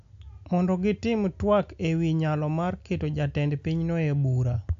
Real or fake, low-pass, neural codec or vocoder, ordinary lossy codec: real; 7.2 kHz; none; AAC, 64 kbps